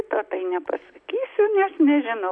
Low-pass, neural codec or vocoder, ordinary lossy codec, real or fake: 9.9 kHz; none; AAC, 64 kbps; real